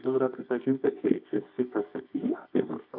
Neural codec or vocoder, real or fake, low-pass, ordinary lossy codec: codec, 24 kHz, 0.9 kbps, WavTokenizer, medium music audio release; fake; 5.4 kHz; AAC, 48 kbps